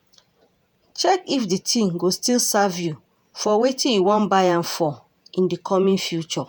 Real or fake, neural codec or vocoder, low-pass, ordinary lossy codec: fake; vocoder, 48 kHz, 128 mel bands, Vocos; none; none